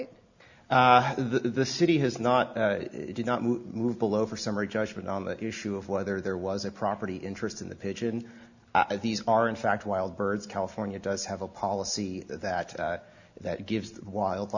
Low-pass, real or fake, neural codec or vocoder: 7.2 kHz; real; none